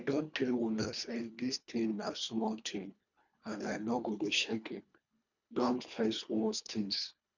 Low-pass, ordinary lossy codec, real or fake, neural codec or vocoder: 7.2 kHz; none; fake; codec, 24 kHz, 1.5 kbps, HILCodec